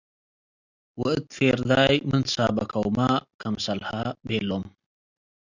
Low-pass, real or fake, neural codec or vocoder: 7.2 kHz; real; none